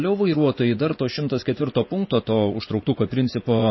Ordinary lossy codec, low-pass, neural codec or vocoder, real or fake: MP3, 24 kbps; 7.2 kHz; vocoder, 24 kHz, 100 mel bands, Vocos; fake